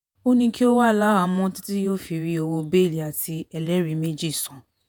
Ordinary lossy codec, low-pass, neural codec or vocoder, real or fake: none; none; vocoder, 48 kHz, 128 mel bands, Vocos; fake